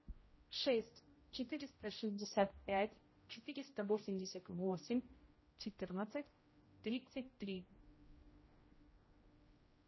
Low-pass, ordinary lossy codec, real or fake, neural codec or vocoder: 7.2 kHz; MP3, 24 kbps; fake; codec, 16 kHz, 0.5 kbps, X-Codec, HuBERT features, trained on general audio